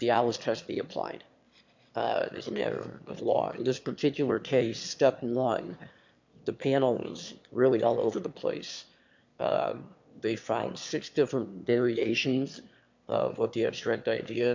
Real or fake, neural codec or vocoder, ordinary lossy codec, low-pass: fake; autoencoder, 22.05 kHz, a latent of 192 numbers a frame, VITS, trained on one speaker; MP3, 64 kbps; 7.2 kHz